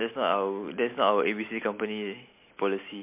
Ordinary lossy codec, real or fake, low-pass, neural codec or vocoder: MP3, 32 kbps; real; 3.6 kHz; none